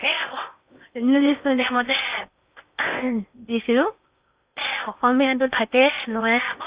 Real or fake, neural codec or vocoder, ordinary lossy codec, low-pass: fake; codec, 16 kHz in and 24 kHz out, 0.8 kbps, FocalCodec, streaming, 65536 codes; Opus, 64 kbps; 3.6 kHz